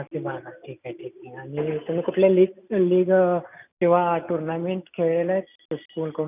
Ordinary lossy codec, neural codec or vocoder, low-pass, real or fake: none; none; 3.6 kHz; real